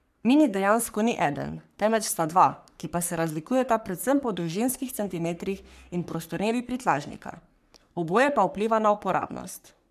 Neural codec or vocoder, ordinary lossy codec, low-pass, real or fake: codec, 44.1 kHz, 3.4 kbps, Pupu-Codec; none; 14.4 kHz; fake